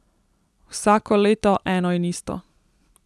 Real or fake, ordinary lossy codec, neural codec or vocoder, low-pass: real; none; none; none